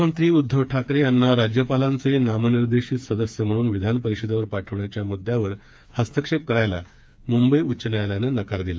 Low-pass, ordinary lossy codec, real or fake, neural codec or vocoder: none; none; fake; codec, 16 kHz, 4 kbps, FreqCodec, smaller model